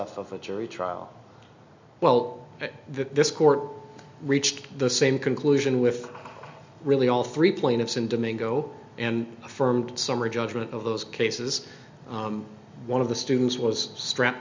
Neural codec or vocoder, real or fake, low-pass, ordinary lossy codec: none; real; 7.2 kHz; MP3, 64 kbps